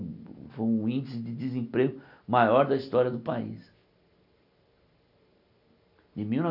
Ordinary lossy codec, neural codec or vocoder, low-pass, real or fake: none; none; 5.4 kHz; real